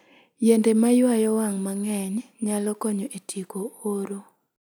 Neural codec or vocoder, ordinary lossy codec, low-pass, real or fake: none; none; none; real